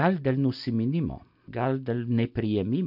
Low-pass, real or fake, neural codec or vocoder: 5.4 kHz; real; none